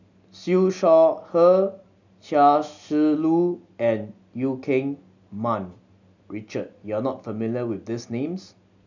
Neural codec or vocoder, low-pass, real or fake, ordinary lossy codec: none; 7.2 kHz; real; none